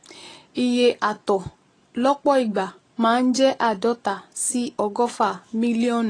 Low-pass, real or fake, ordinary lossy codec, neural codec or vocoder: 9.9 kHz; real; AAC, 32 kbps; none